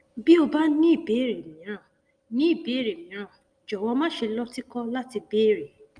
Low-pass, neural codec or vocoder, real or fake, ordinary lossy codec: 9.9 kHz; none; real; Opus, 32 kbps